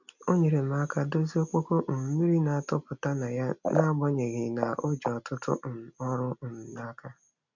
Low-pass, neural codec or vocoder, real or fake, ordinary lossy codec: 7.2 kHz; none; real; none